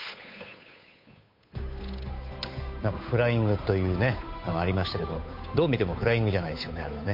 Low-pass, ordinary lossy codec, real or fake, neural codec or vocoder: 5.4 kHz; MP3, 32 kbps; fake; codec, 16 kHz, 8 kbps, FunCodec, trained on Chinese and English, 25 frames a second